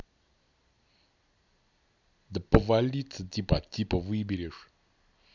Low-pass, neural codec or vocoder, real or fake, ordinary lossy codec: 7.2 kHz; none; real; none